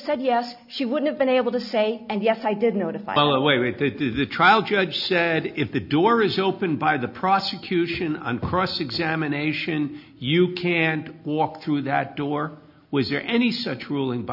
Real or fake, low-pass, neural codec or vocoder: real; 5.4 kHz; none